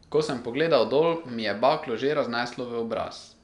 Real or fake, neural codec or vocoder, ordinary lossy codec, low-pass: real; none; none; 10.8 kHz